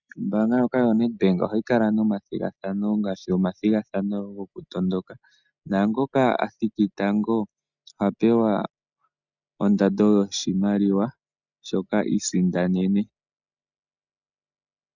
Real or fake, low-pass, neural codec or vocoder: real; 7.2 kHz; none